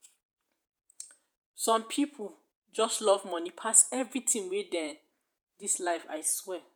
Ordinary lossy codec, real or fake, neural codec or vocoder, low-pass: none; fake; vocoder, 48 kHz, 128 mel bands, Vocos; none